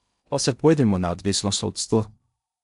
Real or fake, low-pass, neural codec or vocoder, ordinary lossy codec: fake; 10.8 kHz; codec, 16 kHz in and 24 kHz out, 0.6 kbps, FocalCodec, streaming, 2048 codes; none